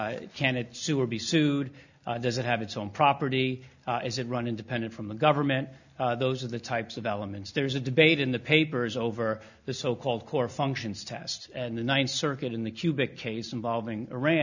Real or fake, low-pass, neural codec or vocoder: real; 7.2 kHz; none